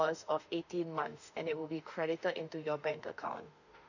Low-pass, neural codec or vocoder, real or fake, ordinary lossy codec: 7.2 kHz; autoencoder, 48 kHz, 32 numbers a frame, DAC-VAE, trained on Japanese speech; fake; none